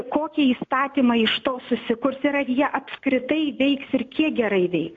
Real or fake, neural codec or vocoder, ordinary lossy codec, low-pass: real; none; AAC, 48 kbps; 7.2 kHz